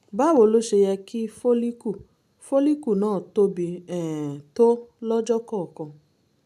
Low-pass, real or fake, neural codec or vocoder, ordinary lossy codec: 14.4 kHz; real; none; none